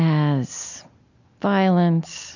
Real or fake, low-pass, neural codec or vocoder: real; 7.2 kHz; none